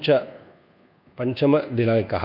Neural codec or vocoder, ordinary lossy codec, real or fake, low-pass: codec, 24 kHz, 1.2 kbps, DualCodec; none; fake; 5.4 kHz